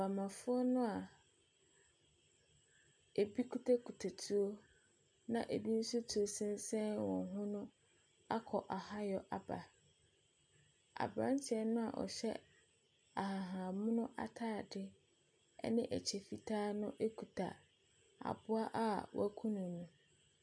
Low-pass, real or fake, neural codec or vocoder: 9.9 kHz; real; none